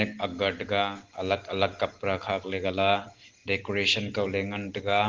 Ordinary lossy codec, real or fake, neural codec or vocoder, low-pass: Opus, 16 kbps; real; none; 7.2 kHz